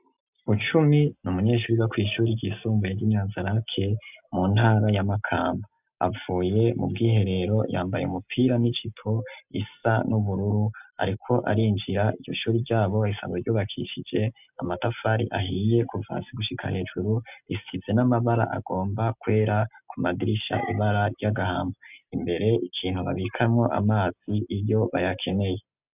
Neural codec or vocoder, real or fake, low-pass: none; real; 3.6 kHz